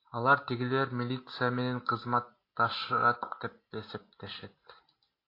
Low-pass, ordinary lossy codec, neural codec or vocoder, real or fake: 5.4 kHz; AAC, 32 kbps; none; real